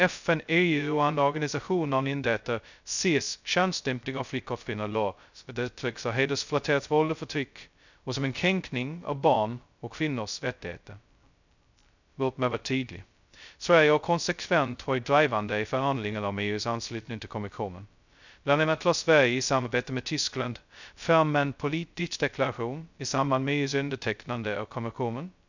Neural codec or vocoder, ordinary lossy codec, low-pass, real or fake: codec, 16 kHz, 0.2 kbps, FocalCodec; none; 7.2 kHz; fake